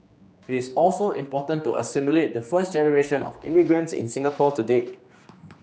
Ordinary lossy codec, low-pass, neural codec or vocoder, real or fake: none; none; codec, 16 kHz, 2 kbps, X-Codec, HuBERT features, trained on general audio; fake